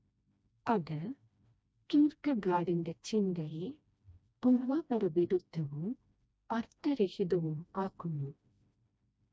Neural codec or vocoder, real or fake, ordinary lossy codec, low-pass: codec, 16 kHz, 1 kbps, FreqCodec, smaller model; fake; none; none